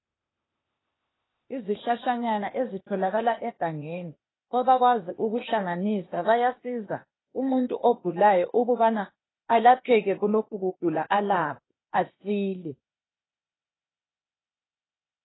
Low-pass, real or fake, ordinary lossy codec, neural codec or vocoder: 7.2 kHz; fake; AAC, 16 kbps; codec, 16 kHz, 0.8 kbps, ZipCodec